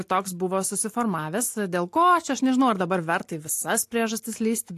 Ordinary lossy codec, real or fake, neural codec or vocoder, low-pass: AAC, 64 kbps; real; none; 14.4 kHz